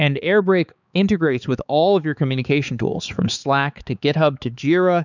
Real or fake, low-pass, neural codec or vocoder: fake; 7.2 kHz; codec, 16 kHz, 4 kbps, X-Codec, HuBERT features, trained on balanced general audio